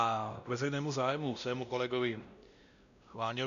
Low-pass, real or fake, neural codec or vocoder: 7.2 kHz; fake; codec, 16 kHz, 1 kbps, X-Codec, WavLM features, trained on Multilingual LibriSpeech